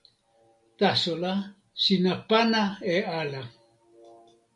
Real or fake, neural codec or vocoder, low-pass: real; none; 10.8 kHz